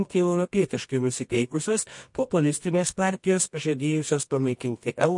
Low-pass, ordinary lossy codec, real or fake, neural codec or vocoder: 10.8 kHz; MP3, 48 kbps; fake; codec, 24 kHz, 0.9 kbps, WavTokenizer, medium music audio release